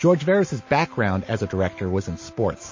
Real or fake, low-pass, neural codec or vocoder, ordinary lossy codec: real; 7.2 kHz; none; MP3, 32 kbps